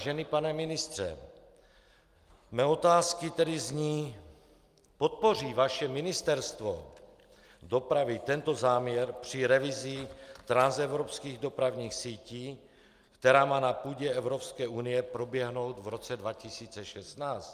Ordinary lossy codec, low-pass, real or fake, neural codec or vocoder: Opus, 32 kbps; 14.4 kHz; real; none